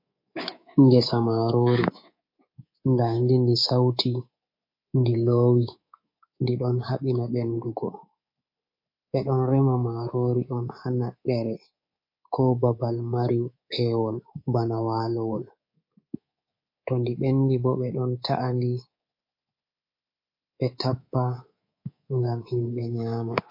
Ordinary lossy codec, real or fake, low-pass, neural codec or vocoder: MP3, 32 kbps; fake; 5.4 kHz; codec, 24 kHz, 3.1 kbps, DualCodec